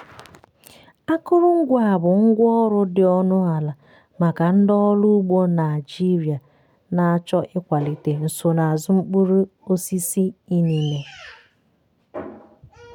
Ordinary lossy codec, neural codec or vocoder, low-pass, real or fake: none; none; 19.8 kHz; real